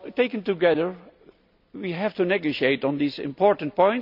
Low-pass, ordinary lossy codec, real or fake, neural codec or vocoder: 5.4 kHz; none; real; none